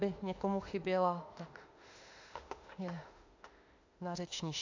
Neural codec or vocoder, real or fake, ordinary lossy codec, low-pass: autoencoder, 48 kHz, 32 numbers a frame, DAC-VAE, trained on Japanese speech; fake; AAC, 48 kbps; 7.2 kHz